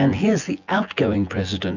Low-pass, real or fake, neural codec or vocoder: 7.2 kHz; fake; vocoder, 24 kHz, 100 mel bands, Vocos